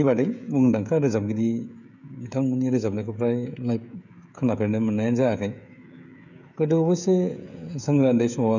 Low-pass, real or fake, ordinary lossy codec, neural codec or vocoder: 7.2 kHz; fake; none; codec, 16 kHz, 16 kbps, FreqCodec, smaller model